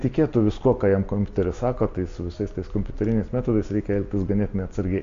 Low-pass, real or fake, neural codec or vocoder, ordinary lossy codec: 7.2 kHz; real; none; AAC, 48 kbps